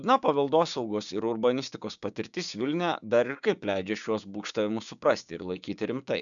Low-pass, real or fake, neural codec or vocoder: 7.2 kHz; fake; codec, 16 kHz, 6 kbps, DAC